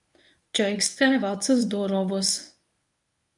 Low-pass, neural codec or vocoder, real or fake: 10.8 kHz; codec, 24 kHz, 0.9 kbps, WavTokenizer, medium speech release version 1; fake